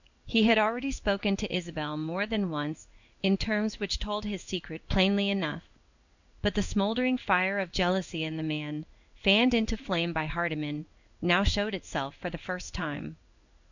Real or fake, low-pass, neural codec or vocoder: fake; 7.2 kHz; codec, 16 kHz in and 24 kHz out, 1 kbps, XY-Tokenizer